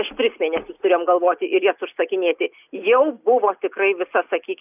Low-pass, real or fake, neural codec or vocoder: 3.6 kHz; real; none